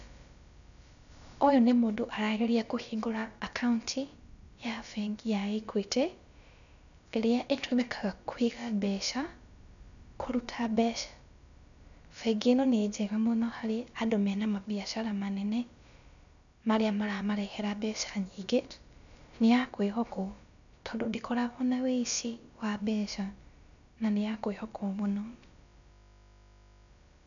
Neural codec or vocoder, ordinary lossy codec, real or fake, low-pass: codec, 16 kHz, about 1 kbps, DyCAST, with the encoder's durations; none; fake; 7.2 kHz